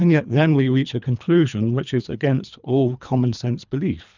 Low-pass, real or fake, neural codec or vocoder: 7.2 kHz; fake; codec, 24 kHz, 3 kbps, HILCodec